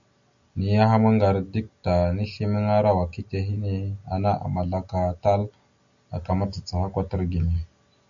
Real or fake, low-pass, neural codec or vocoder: real; 7.2 kHz; none